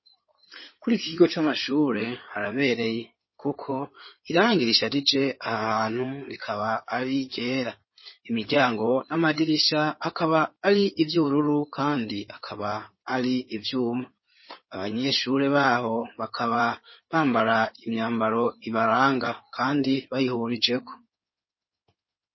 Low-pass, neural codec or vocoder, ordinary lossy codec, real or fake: 7.2 kHz; codec, 16 kHz in and 24 kHz out, 2.2 kbps, FireRedTTS-2 codec; MP3, 24 kbps; fake